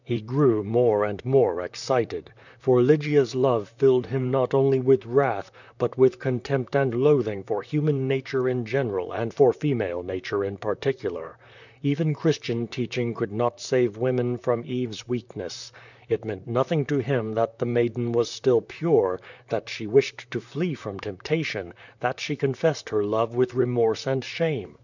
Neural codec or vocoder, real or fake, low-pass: vocoder, 44.1 kHz, 128 mel bands, Pupu-Vocoder; fake; 7.2 kHz